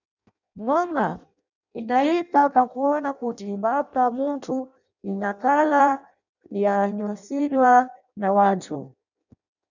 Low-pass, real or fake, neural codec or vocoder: 7.2 kHz; fake; codec, 16 kHz in and 24 kHz out, 0.6 kbps, FireRedTTS-2 codec